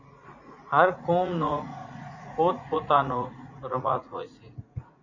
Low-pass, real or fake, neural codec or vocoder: 7.2 kHz; fake; vocoder, 44.1 kHz, 80 mel bands, Vocos